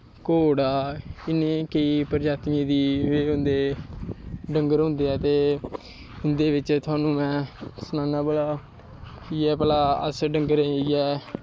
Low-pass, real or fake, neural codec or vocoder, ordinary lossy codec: none; real; none; none